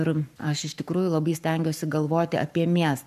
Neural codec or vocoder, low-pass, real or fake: codec, 44.1 kHz, 7.8 kbps, Pupu-Codec; 14.4 kHz; fake